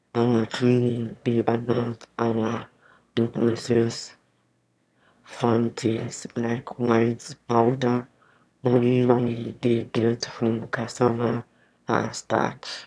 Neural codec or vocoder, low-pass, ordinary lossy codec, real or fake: autoencoder, 22.05 kHz, a latent of 192 numbers a frame, VITS, trained on one speaker; none; none; fake